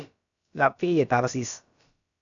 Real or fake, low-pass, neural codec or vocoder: fake; 7.2 kHz; codec, 16 kHz, about 1 kbps, DyCAST, with the encoder's durations